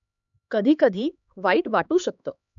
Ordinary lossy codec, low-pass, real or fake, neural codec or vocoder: none; 7.2 kHz; fake; codec, 16 kHz, 2 kbps, X-Codec, HuBERT features, trained on LibriSpeech